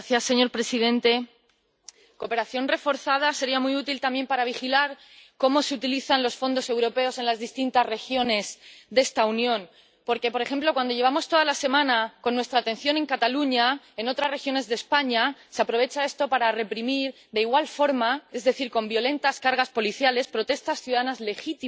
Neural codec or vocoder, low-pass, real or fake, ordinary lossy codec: none; none; real; none